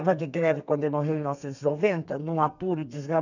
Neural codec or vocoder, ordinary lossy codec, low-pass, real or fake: codec, 32 kHz, 1.9 kbps, SNAC; none; 7.2 kHz; fake